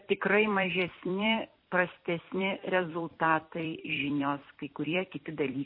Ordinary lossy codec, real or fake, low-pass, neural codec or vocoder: MP3, 24 kbps; fake; 5.4 kHz; vocoder, 44.1 kHz, 128 mel bands every 256 samples, BigVGAN v2